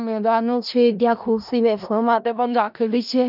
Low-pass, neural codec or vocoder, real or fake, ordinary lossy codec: 5.4 kHz; codec, 16 kHz in and 24 kHz out, 0.4 kbps, LongCat-Audio-Codec, four codebook decoder; fake; none